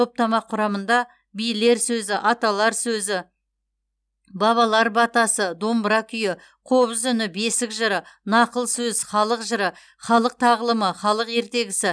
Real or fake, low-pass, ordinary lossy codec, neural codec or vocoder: real; none; none; none